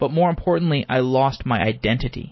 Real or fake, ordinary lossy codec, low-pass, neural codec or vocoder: real; MP3, 24 kbps; 7.2 kHz; none